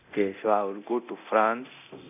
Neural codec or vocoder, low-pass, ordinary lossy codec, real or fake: codec, 24 kHz, 0.9 kbps, DualCodec; 3.6 kHz; none; fake